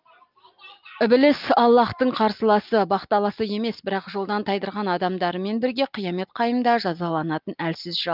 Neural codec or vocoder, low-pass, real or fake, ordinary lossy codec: none; 5.4 kHz; real; Opus, 24 kbps